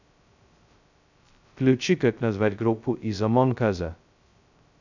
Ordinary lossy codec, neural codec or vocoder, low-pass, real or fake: none; codec, 16 kHz, 0.2 kbps, FocalCodec; 7.2 kHz; fake